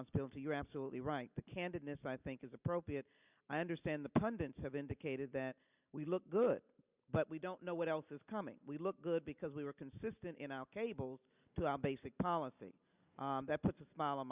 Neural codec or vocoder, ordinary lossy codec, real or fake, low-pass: none; Opus, 64 kbps; real; 3.6 kHz